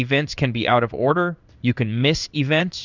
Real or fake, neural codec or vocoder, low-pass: fake; codec, 16 kHz in and 24 kHz out, 1 kbps, XY-Tokenizer; 7.2 kHz